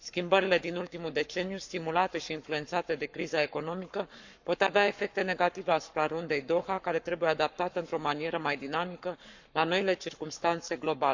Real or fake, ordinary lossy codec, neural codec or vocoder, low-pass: fake; none; codec, 44.1 kHz, 7.8 kbps, Pupu-Codec; 7.2 kHz